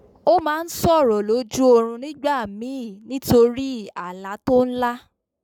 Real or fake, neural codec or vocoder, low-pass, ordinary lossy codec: fake; codec, 44.1 kHz, 7.8 kbps, Pupu-Codec; 19.8 kHz; none